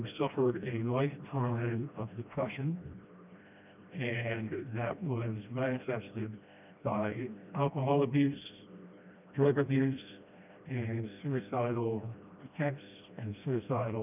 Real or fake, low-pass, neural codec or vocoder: fake; 3.6 kHz; codec, 16 kHz, 1 kbps, FreqCodec, smaller model